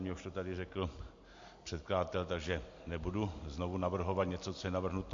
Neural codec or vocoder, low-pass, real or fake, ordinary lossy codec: none; 7.2 kHz; real; MP3, 48 kbps